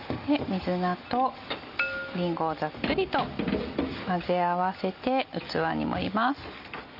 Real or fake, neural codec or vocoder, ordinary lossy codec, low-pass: real; none; none; 5.4 kHz